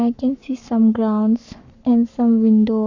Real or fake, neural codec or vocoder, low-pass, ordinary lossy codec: fake; codec, 44.1 kHz, 7.8 kbps, Pupu-Codec; 7.2 kHz; none